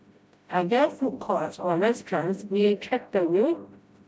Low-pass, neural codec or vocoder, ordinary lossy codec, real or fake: none; codec, 16 kHz, 0.5 kbps, FreqCodec, smaller model; none; fake